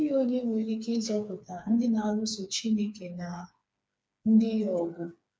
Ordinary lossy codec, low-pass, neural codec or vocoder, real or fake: none; none; codec, 16 kHz, 4 kbps, FreqCodec, smaller model; fake